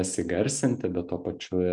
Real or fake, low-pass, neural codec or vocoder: real; 10.8 kHz; none